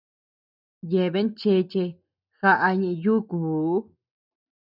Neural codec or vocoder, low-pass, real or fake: none; 5.4 kHz; real